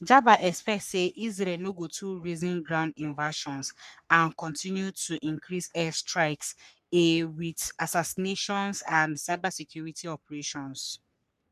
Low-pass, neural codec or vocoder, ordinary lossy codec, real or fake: 14.4 kHz; codec, 44.1 kHz, 3.4 kbps, Pupu-Codec; none; fake